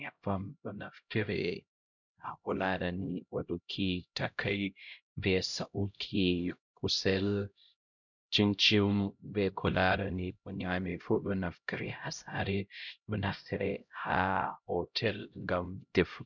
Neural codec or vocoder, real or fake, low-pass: codec, 16 kHz, 0.5 kbps, X-Codec, HuBERT features, trained on LibriSpeech; fake; 7.2 kHz